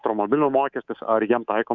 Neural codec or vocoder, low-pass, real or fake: codec, 24 kHz, 3.1 kbps, DualCodec; 7.2 kHz; fake